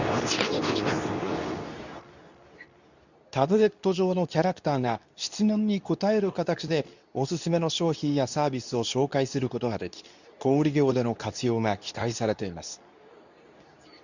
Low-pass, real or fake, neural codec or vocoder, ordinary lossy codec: 7.2 kHz; fake; codec, 24 kHz, 0.9 kbps, WavTokenizer, medium speech release version 2; none